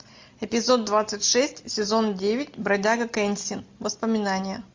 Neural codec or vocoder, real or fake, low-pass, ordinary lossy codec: none; real; 7.2 kHz; MP3, 48 kbps